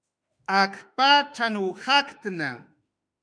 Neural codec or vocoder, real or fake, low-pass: autoencoder, 48 kHz, 32 numbers a frame, DAC-VAE, trained on Japanese speech; fake; 9.9 kHz